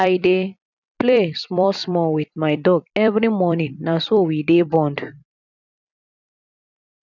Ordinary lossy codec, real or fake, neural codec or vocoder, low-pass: none; fake; vocoder, 44.1 kHz, 128 mel bands every 256 samples, BigVGAN v2; 7.2 kHz